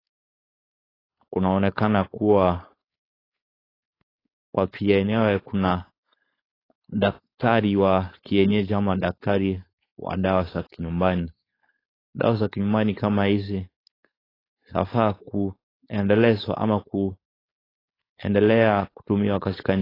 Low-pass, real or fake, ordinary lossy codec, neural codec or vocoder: 5.4 kHz; fake; AAC, 24 kbps; codec, 16 kHz, 4.8 kbps, FACodec